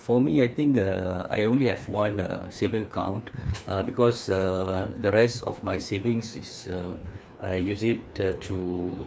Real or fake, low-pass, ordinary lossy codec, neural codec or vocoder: fake; none; none; codec, 16 kHz, 2 kbps, FreqCodec, larger model